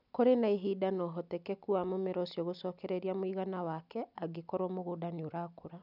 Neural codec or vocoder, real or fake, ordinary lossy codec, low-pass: none; real; none; 5.4 kHz